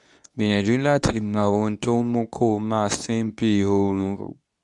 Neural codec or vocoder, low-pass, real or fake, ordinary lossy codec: codec, 24 kHz, 0.9 kbps, WavTokenizer, medium speech release version 2; 10.8 kHz; fake; none